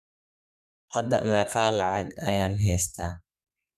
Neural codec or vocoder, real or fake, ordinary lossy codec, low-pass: codec, 32 kHz, 1.9 kbps, SNAC; fake; none; 14.4 kHz